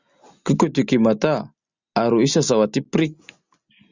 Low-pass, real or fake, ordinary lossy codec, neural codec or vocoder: 7.2 kHz; real; Opus, 64 kbps; none